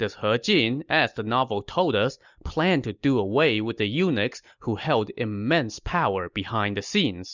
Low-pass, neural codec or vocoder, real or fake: 7.2 kHz; none; real